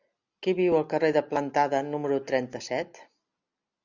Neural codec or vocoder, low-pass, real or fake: none; 7.2 kHz; real